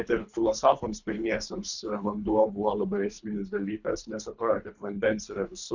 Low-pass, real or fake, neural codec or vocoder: 7.2 kHz; fake; codec, 24 kHz, 3 kbps, HILCodec